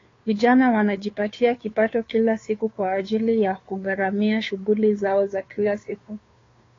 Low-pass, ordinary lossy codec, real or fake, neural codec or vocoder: 7.2 kHz; AAC, 32 kbps; fake; codec, 16 kHz, 2 kbps, FunCodec, trained on Chinese and English, 25 frames a second